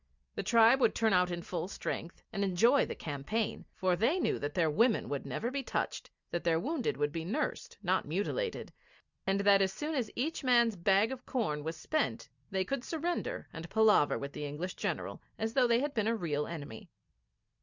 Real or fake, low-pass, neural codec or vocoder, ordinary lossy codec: real; 7.2 kHz; none; Opus, 64 kbps